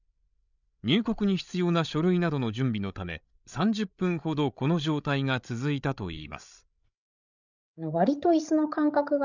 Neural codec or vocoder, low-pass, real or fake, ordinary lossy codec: codec, 16 kHz, 16 kbps, FreqCodec, larger model; 7.2 kHz; fake; none